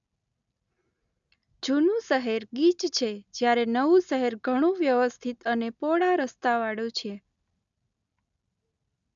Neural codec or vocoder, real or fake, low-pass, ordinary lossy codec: none; real; 7.2 kHz; none